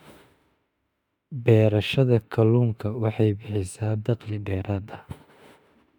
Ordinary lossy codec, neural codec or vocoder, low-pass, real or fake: none; autoencoder, 48 kHz, 32 numbers a frame, DAC-VAE, trained on Japanese speech; 19.8 kHz; fake